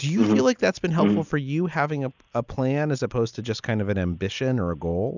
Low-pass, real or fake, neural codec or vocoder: 7.2 kHz; real; none